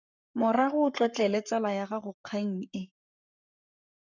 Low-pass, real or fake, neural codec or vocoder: 7.2 kHz; fake; codec, 44.1 kHz, 7.8 kbps, Pupu-Codec